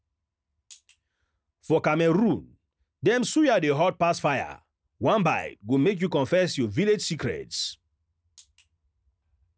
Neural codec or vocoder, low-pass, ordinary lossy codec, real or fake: none; none; none; real